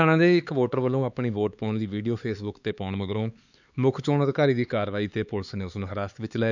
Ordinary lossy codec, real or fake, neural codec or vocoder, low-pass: none; fake; codec, 16 kHz, 4 kbps, X-Codec, HuBERT features, trained on LibriSpeech; 7.2 kHz